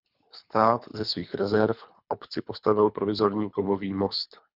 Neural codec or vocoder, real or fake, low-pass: codec, 24 kHz, 3 kbps, HILCodec; fake; 5.4 kHz